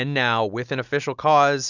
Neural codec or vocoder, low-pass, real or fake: none; 7.2 kHz; real